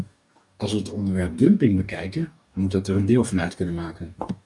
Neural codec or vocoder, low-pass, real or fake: codec, 44.1 kHz, 2.6 kbps, DAC; 10.8 kHz; fake